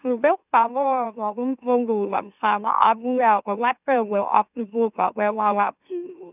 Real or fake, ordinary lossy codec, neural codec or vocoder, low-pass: fake; none; autoencoder, 44.1 kHz, a latent of 192 numbers a frame, MeloTTS; 3.6 kHz